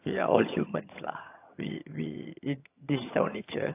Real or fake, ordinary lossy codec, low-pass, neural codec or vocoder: fake; AAC, 24 kbps; 3.6 kHz; vocoder, 22.05 kHz, 80 mel bands, HiFi-GAN